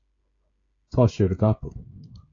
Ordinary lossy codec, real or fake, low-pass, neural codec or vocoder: AAC, 48 kbps; fake; 7.2 kHz; codec, 16 kHz, 16 kbps, FreqCodec, smaller model